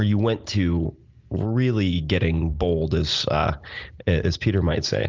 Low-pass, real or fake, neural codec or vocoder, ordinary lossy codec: 7.2 kHz; real; none; Opus, 32 kbps